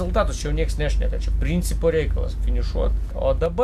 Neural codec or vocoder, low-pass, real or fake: none; 14.4 kHz; real